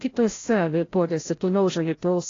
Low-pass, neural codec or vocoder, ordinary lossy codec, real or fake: 7.2 kHz; codec, 16 kHz, 0.5 kbps, FreqCodec, larger model; AAC, 32 kbps; fake